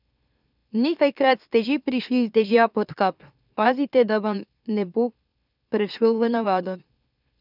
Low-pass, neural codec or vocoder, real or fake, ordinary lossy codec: 5.4 kHz; autoencoder, 44.1 kHz, a latent of 192 numbers a frame, MeloTTS; fake; none